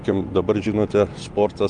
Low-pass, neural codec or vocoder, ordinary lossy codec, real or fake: 9.9 kHz; none; Opus, 32 kbps; real